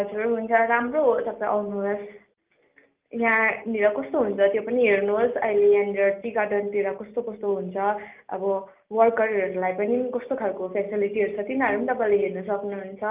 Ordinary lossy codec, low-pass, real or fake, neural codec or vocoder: Opus, 24 kbps; 3.6 kHz; real; none